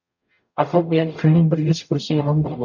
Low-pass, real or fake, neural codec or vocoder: 7.2 kHz; fake; codec, 44.1 kHz, 0.9 kbps, DAC